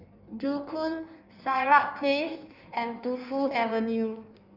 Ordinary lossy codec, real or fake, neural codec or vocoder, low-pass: none; fake; codec, 16 kHz in and 24 kHz out, 1.1 kbps, FireRedTTS-2 codec; 5.4 kHz